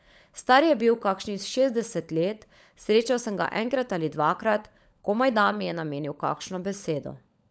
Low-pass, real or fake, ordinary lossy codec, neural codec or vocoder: none; fake; none; codec, 16 kHz, 16 kbps, FunCodec, trained on LibriTTS, 50 frames a second